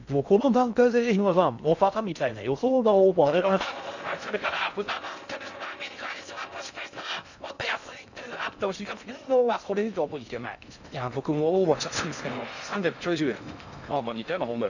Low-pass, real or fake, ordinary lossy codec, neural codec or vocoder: 7.2 kHz; fake; none; codec, 16 kHz in and 24 kHz out, 0.6 kbps, FocalCodec, streaming, 2048 codes